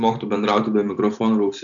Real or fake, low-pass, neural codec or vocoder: real; 7.2 kHz; none